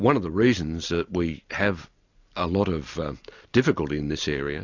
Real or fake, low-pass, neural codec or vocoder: real; 7.2 kHz; none